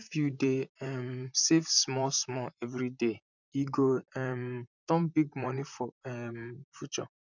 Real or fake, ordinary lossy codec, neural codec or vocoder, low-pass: fake; none; vocoder, 44.1 kHz, 128 mel bands, Pupu-Vocoder; 7.2 kHz